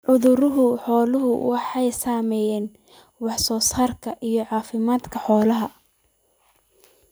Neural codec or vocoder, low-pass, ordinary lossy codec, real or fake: vocoder, 44.1 kHz, 128 mel bands every 256 samples, BigVGAN v2; none; none; fake